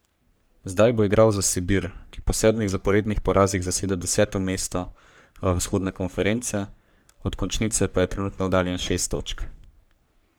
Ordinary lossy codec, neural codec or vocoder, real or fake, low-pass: none; codec, 44.1 kHz, 3.4 kbps, Pupu-Codec; fake; none